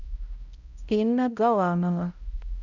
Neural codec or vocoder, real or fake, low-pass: codec, 16 kHz, 0.5 kbps, X-Codec, HuBERT features, trained on balanced general audio; fake; 7.2 kHz